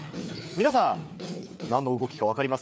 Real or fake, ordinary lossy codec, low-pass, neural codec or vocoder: fake; none; none; codec, 16 kHz, 4 kbps, FreqCodec, larger model